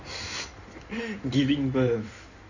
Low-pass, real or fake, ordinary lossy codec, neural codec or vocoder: 7.2 kHz; fake; none; codec, 16 kHz in and 24 kHz out, 2.2 kbps, FireRedTTS-2 codec